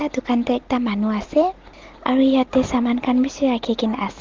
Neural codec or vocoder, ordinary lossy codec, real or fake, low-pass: none; Opus, 16 kbps; real; 7.2 kHz